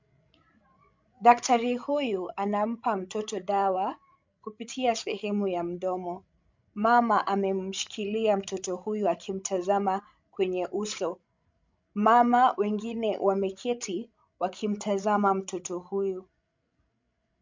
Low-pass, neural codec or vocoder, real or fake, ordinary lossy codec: 7.2 kHz; codec, 16 kHz, 16 kbps, FreqCodec, larger model; fake; MP3, 64 kbps